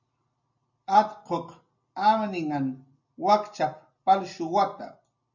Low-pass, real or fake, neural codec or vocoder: 7.2 kHz; real; none